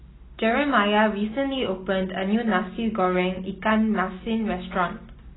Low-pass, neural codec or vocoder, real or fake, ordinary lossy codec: 7.2 kHz; none; real; AAC, 16 kbps